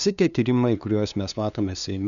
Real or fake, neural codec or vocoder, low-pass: fake; codec, 16 kHz, 2 kbps, FunCodec, trained on LibriTTS, 25 frames a second; 7.2 kHz